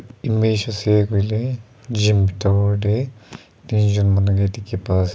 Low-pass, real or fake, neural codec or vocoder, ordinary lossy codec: none; real; none; none